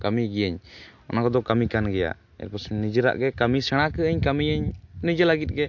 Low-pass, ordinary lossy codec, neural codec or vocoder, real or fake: 7.2 kHz; MP3, 48 kbps; none; real